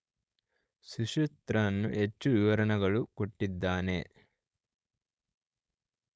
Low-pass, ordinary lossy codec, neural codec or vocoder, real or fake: none; none; codec, 16 kHz, 4.8 kbps, FACodec; fake